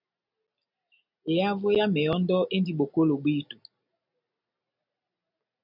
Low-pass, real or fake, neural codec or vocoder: 5.4 kHz; real; none